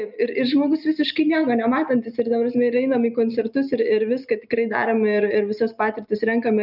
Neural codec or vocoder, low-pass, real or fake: none; 5.4 kHz; real